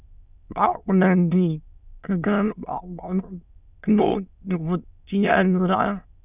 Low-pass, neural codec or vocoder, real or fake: 3.6 kHz; autoencoder, 22.05 kHz, a latent of 192 numbers a frame, VITS, trained on many speakers; fake